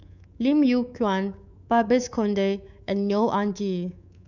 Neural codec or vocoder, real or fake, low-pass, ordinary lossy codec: codec, 24 kHz, 3.1 kbps, DualCodec; fake; 7.2 kHz; none